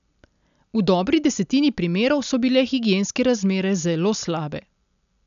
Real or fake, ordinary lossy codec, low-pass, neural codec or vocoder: real; none; 7.2 kHz; none